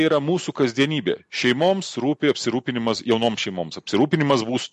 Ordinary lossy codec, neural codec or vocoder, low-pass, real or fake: MP3, 48 kbps; none; 14.4 kHz; real